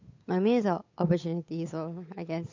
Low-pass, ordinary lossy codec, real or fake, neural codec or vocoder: 7.2 kHz; MP3, 48 kbps; fake; codec, 16 kHz, 8 kbps, FunCodec, trained on Chinese and English, 25 frames a second